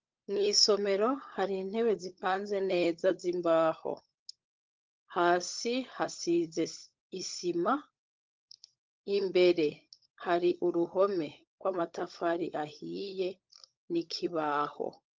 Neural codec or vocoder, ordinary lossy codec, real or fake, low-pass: codec, 16 kHz, 16 kbps, FunCodec, trained on LibriTTS, 50 frames a second; Opus, 24 kbps; fake; 7.2 kHz